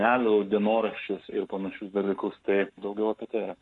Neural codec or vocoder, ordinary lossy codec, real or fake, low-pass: codec, 44.1 kHz, 7.8 kbps, Pupu-Codec; AAC, 64 kbps; fake; 10.8 kHz